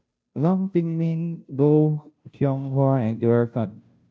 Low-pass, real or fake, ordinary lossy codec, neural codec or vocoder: none; fake; none; codec, 16 kHz, 0.5 kbps, FunCodec, trained on Chinese and English, 25 frames a second